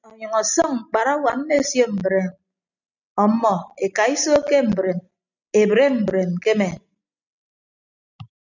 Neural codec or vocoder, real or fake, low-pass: none; real; 7.2 kHz